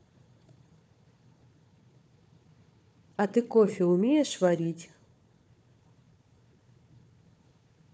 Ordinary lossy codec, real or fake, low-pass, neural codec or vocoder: none; fake; none; codec, 16 kHz, 4 kbps, FunCodec, trained on Chinese and English, 50 frames a second